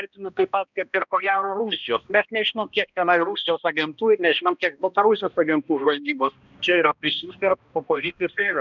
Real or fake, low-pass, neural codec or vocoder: fake; 7.2 kHz; codec, 16 kHz, 1 kbps, X-Codec, HuBERT features, trained on balanced general audio